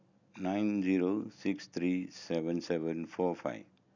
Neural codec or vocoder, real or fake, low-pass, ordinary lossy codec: none; real; 7.2 kHz; none